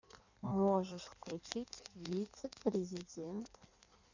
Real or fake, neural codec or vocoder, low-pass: fake; codec, 16 kHz in and 24 kHz out, 1.1 kbps, FireRedTTS-2 codec; 7.2 kHz